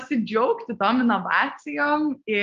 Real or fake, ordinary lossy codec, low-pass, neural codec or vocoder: real; Opus, 32 kbps; 7.2 kHz; none